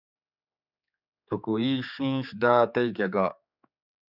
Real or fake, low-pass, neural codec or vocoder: fake; 5.4 kHz; codec, 16 kHz, 4 kbps, X-Codec, HuBERT features, trained on general audio